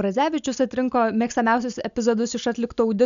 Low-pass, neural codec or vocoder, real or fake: 7.2 kHz; none; real